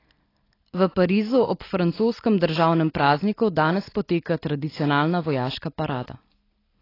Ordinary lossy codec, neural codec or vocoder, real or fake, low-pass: AAC, 24 kbps; none; real; 5.4 kHz